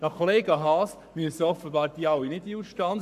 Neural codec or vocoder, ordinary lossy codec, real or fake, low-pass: codec, 44.1 kHz, 7.8 kbps, Pupu-Codec; AAC, 96 kbps; fake; 14.4 kHz